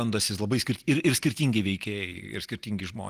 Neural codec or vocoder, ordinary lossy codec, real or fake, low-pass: none; Opus, 24 kbps; real; 14.4 kHz